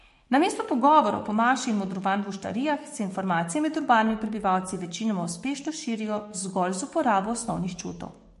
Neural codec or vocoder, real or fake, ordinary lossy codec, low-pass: codec, 44.1 kHz, 7.8 kbps, DAC; fake; MP3, 48 kbps; 14.4 kHz